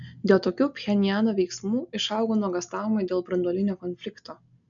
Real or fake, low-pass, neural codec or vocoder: real; 7.2 kHz; none